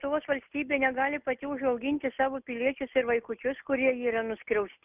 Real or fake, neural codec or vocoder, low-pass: real; none; 3.6 kHz